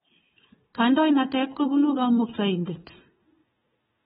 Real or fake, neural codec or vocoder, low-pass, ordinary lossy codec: fake; codec, 16 kHz, 4 kbps, FunCodec, trained on Chinese and English, 50 frames a second; 7.2 kHz; AAC, 16 kbps